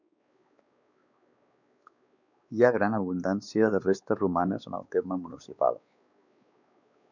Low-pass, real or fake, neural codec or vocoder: 7.2 kHz; fake; codec, 16 kHz, 4 kbps, X-Codec, WavLM features, trained on Multilingual LibriSpeech